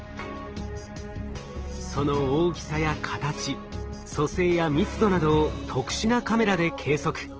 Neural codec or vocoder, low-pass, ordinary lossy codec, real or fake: none; 7.2 kHz; Opus, 16 kbps; real